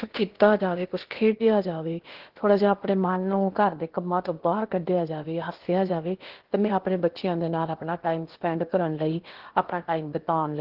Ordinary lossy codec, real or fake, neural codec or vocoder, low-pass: Opus, 16 kbps; fake; codec, 16 kHz in and 24 kHz out, 0.8 kbps, FocalCodec, streaming, 65536 codes; 5.4 kHz